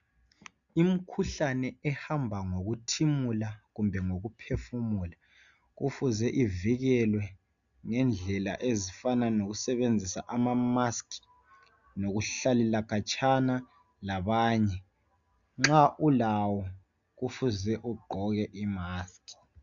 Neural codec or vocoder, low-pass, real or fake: none; 7.2 kHz; real